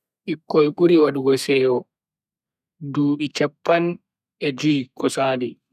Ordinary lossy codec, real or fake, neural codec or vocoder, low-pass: none; fake; codec, 32 kHz, 1.9 kbps, SNAC; 14.4 kHz